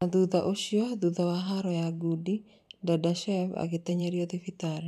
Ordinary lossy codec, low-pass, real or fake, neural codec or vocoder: none; 14.4 kHz; fake; vocoder, 44.1 kHz, 128 mel bands every 512 samples, BigVGAN v2